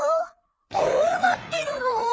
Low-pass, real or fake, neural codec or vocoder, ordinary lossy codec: none; fake; codec, 16 kHz, 4 kbps, FreqCodec, larger model; none